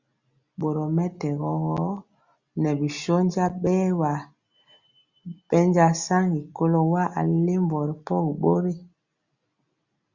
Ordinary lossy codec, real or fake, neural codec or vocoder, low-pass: Opus, 64 kbps; real; none; 7.2 kHz